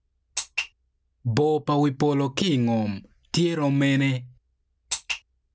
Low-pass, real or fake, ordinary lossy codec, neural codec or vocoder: none; real; none; none